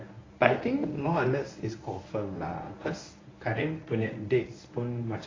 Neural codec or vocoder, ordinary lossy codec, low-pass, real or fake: codec, 24 kHz, 0.9 kbps, WavTokenizer, medium speech release version 1; AAC, 32 kbps; 7.2 kHz; fake